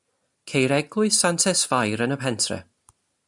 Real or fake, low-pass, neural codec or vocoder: real; 10.8 kHz; none